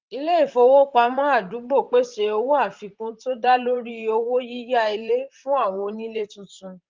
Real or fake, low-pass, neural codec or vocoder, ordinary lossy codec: fake; 7.2 kHz; vocoder, 44.1 kHz, 128 mel bands, Pupu-Vocoder; Opus, 32 kbps